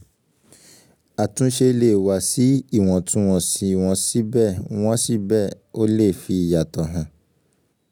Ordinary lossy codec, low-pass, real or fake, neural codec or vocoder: none; none; real; none